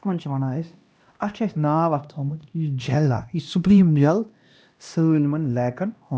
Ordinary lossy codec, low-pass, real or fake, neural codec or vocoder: none; none; fake; codec, 16 kHz, 1 kbps, X-Codec, HuBERT features, trained on LibriSpeech